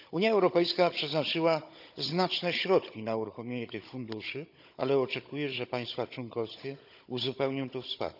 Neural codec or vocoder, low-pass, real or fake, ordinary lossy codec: codec, 16 kHz, 16 kbps, FunCodec, trained on Chinese and English, 50 frames a second; 5.4 kHz; fake; none